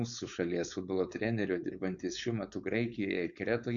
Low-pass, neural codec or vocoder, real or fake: 7.2 kHz; codec, 16 kHz, 4.8 kbps, FACodec; fake